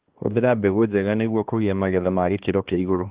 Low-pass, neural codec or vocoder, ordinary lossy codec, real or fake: 3.6 kHz; codec, 16 kHz, 2 kbps, X-Codec, HuBERT features, trained on balanced general audio; Opus, 16 kbps; fake